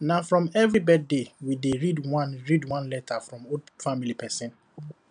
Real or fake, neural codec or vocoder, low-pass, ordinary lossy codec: real; none; 9.9 kHz; none